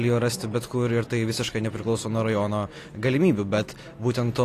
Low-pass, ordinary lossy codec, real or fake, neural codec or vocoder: 14.4 kHz; AAC, 48 kbps; real; none